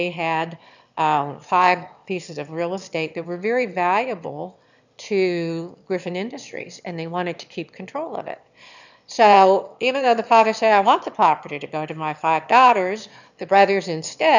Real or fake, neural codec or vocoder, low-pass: fake; autoencoder, 22.05 kHz, a latent of 192 numbers a frame, VITS, trained on one speaker; 7.2 kHz